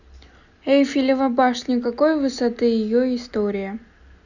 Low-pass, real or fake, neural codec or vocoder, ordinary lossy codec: 7.2 kHz; real; none; none